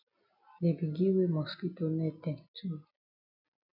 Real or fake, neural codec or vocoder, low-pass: real; none; 5.4 kHz